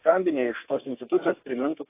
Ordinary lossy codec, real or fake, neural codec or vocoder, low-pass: AAC, 24 kbps; fake; codec, 32 kHz, 1.9 kbps, SNAC; 3.6 kHz